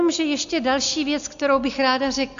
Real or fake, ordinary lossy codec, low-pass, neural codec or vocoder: real; Opus, 64 kbps; 7.2 kHz; none